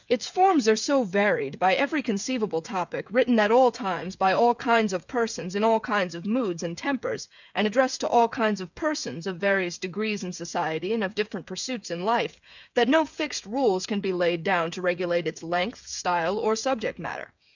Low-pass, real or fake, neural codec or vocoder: 7.2 kHz; fake; codec, 16 kHz, 8 kbps, FreqCodec, smaller model